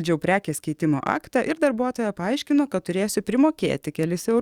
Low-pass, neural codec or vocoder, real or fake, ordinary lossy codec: 19.8 kHz; codec, 44.1 kHz, 7.8 kbps, DAC; fake; Opus, 64 kbps